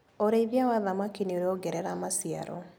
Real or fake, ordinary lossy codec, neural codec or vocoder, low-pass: real; none; none; none